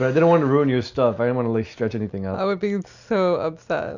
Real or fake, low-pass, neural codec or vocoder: real; 7.2 kHz; none